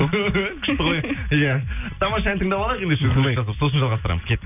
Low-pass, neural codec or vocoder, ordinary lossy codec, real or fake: 3.6 kHz; none; none; real